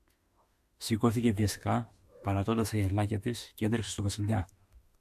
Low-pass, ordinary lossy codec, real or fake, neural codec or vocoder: 14.4 kHz; AAC, 96 kbps; fake; autoencoder, 48 kHz, 32 numbers a frame, DAC-VAE, trained on Japanese speech